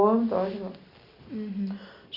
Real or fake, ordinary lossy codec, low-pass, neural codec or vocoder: real; Opus, 64 kbps; 5.4 kHz; none